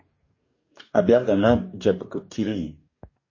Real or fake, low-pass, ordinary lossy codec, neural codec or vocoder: fake; 7.2 kHz; MP3, 32 kbps; codec, 44.1 kHz, 2.6 kbps, DAC